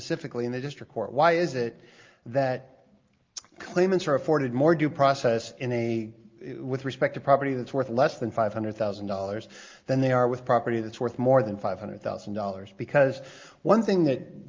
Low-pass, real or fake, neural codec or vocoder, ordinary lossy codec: 7.2 kHz; real; none; Opus, 24 kbps